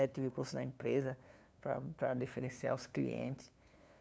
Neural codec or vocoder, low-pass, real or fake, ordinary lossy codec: codec, 16 kHz, 2 kbps, FunCodec, trained on LibriTTS, 25 frames a second; none; fake; none